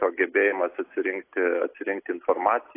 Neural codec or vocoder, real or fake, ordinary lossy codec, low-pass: none; real; AAC, 24 kbps; 3.6 kHz